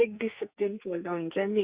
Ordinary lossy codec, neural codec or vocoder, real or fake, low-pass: Opus, 64 kbps; codec, 44.1 kHz, 2.6 kbps, SNAC; fake; 3.6 kHz